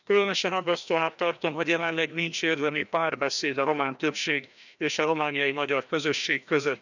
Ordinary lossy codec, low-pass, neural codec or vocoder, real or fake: none; 7.2 kHz; codec, 16 kHz, 1 kbps, FreqCodec, larger model; fake